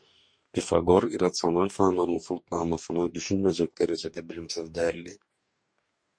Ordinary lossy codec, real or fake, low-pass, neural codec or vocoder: MP3, 48 kbps; fake; 9.9 kHz; codec, 44.1 kHz, 2.6 kbps, DAC